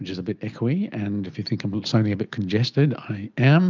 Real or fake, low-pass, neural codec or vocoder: real; 7.2 kHz; none